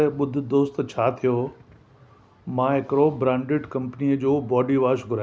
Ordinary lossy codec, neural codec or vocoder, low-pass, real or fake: none; none; none; real